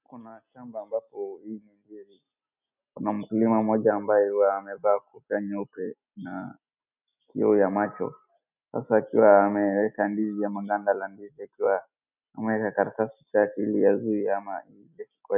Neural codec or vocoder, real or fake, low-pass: none; real; 3.6 kHz